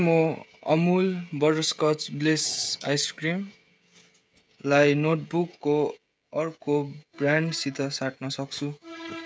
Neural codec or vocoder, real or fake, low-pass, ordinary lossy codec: codec, 16 kHz, 16 kbps, FreqCodec, smaller model; fake; none; none